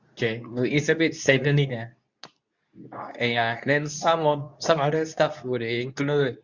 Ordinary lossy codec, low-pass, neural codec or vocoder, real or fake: Opus, 64 kbps; 7.2 kHz; codec, 24 kHz, 0.9 kbps, WavTokenizer, medium speech release version 2; fake